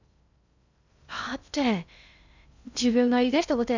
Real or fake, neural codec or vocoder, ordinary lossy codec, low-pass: fake; codec, 16 kHz in and 24 kHz out, 0.6 kbps, FocalCodec, streaming, 2048 codes; none; 7.2 kHz